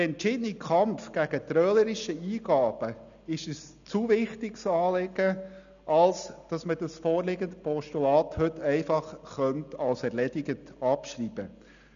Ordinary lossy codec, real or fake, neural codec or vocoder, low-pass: MP3, 96 kbps; real; none; 7.2 kHz